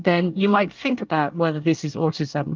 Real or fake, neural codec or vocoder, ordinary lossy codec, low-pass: fake; codec, 24 kHz, 1 kbps, SNAC; Opus, 24 kbps; 7.2 kHz